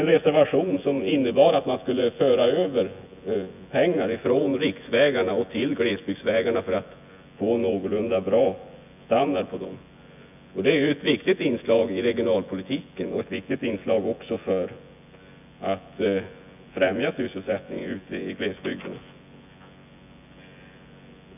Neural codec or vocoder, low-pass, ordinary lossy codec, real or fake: vocoder, 24 kHz, 100 mel bands, Vocos; 3.6 kHz; none; fake